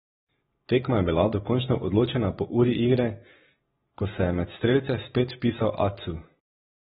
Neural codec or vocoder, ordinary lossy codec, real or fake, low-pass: none; AAC, 16 kbps; real; 19.8 kHz